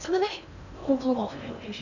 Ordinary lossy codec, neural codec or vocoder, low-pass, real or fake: none; codec, 16 kHz in and 24 kHz out, 0.6 kbps, FocalCodec, streaming, 2048 codes; 7.2 kHz; fake